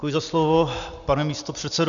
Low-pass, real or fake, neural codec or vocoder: 7.2 kHz; real; none